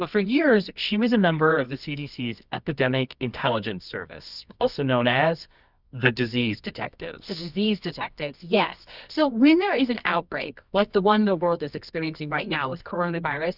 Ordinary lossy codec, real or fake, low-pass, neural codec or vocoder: Opus, 64 kbps; fake; 5.4 kHz; codec, 24 kHz, 0.9 kbps, WavTokenizer, medium music audio release